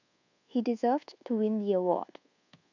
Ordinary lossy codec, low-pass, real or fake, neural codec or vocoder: none; 7.2 kHz; fake; codec, 24 kHz, 1.2 kbps, DualCodec